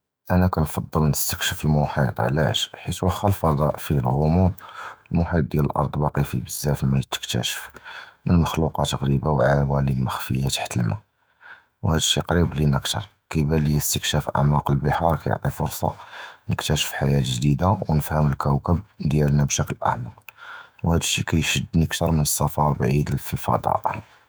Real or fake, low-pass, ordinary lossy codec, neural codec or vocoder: fake; none; none; autoencoder, 48 kHz, 128 numbers a frame, DAC-VAE, trained on Japanese speech